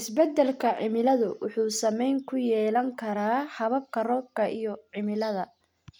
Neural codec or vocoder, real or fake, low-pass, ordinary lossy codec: none; real; 19.8 kHz; none